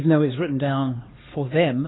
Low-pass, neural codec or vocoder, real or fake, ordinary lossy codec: 7.2 kHz; codec, 16 kHz, 2 kbps, X-Codec, HuBERT features, trained on LibriSpeech; fake; AAC, 16 kbps